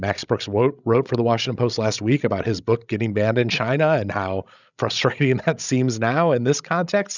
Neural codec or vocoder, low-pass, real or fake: codec, 16 kHz, 16 kbps, FreqCodec, larger model; 7.2 kHz; fake